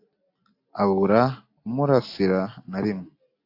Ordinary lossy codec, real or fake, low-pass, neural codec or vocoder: AAC, 32 kbps; real; 5.4 kHz; none